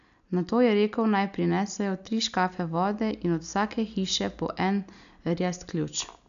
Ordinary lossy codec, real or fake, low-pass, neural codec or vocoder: none; real; 7.2 kHz; none